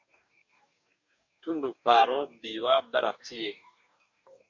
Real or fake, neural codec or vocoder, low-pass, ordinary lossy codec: fake; codec, 44.1 kHz, 2.6 kbps, DAC; 7.2 kHz; MP3, 64 kbps